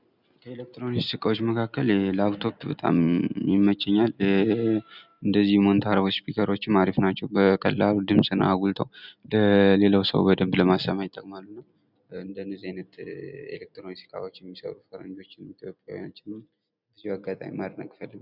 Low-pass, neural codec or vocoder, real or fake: 5.4 kHz; none; real